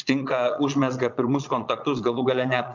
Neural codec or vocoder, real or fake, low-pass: vocoder, 22.05 kHz, 80 mel bands, Vocos; fake; 7.2 kHz